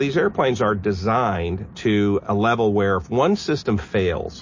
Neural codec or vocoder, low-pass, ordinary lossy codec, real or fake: none; 7.2 kHz; MP3, 32 kbps; real